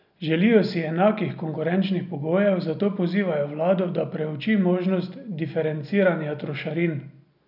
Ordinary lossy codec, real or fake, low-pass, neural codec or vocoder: none; real; 5.4 kHz; none